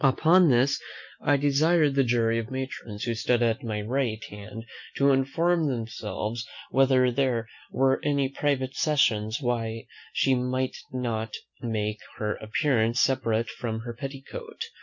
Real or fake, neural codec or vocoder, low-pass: real; none; 7.2 kHz